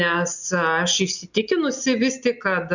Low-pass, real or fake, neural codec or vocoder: 7.2 kHz; real; none